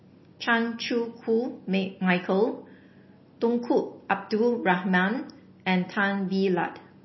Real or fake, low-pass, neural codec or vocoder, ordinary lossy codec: real; 7.2 kHz; none; MP3, 24 kbps